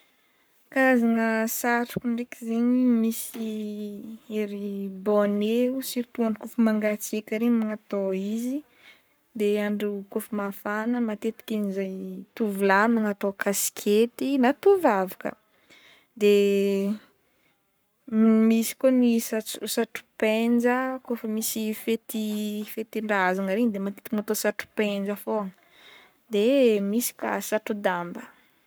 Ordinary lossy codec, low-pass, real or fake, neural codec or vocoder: none; none; fake; codec, 44.1 kHz, 7.8 kbps, Pupu-Codec